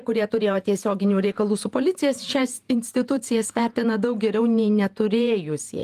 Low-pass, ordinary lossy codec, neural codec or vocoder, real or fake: 14.4 kHz; Opus, 32 kbps; vocoder, 48 kHz, 128 mel bands, Vocos; fake